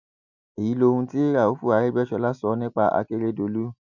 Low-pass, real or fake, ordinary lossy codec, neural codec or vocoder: 7.2 kHz; real; none; none